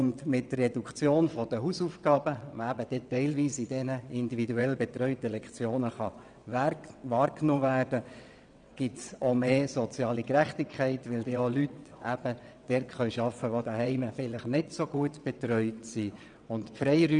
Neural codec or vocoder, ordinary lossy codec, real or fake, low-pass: vocoder, 22.05 kHz, 80 mel bands, WaveNeXt; none; fake; 9.9 kHz